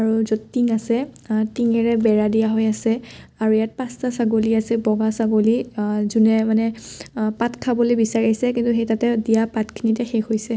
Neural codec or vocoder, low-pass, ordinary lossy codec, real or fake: none; none; none; real